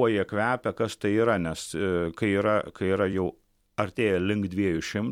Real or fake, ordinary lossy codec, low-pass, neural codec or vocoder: fake; MP3, 96 kbps; 19.8 kHz; vocoder, 44.1 kHz, 128 mel bands every 256 samples, BigVGAN v2